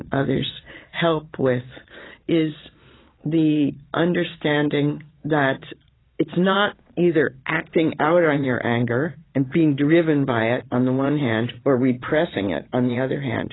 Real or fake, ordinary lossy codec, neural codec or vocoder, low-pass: fake; AAC, 16 kbps; vocoder, 22.05 kHz, 80 mel bands, Vocos; 7.2 kHz